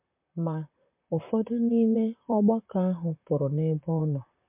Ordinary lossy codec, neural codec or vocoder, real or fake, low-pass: MP3, 32 kbps; vocoder, 44.1 kHz, 128 mel bands, Pupu-Vocoder; fake; 3.6 kHz